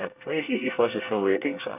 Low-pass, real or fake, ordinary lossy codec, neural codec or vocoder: 3.6 kHz; fake; none; codec, 24 kHz, 1 kbps, SNAC